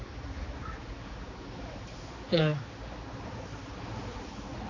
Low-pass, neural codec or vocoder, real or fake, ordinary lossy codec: 7.2 kHz; codec, 16 kHz, 4 kbps, X-Codec, HuBERT features, trained on general audio; fake; AAC, 32 kbps